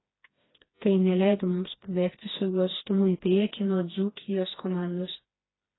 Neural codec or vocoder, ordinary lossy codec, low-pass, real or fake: codec, 16 kHz, 2 kbps, FreqCodec, smaller model; AAC, 16 kbps; 7.2 kHz; fake